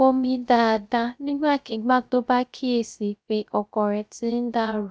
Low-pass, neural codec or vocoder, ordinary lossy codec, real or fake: none; codec, 16 kHz, 0.3 kbps, FocalCodec; none; fake